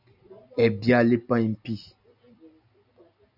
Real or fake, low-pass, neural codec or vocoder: real; 5.4 kHz; none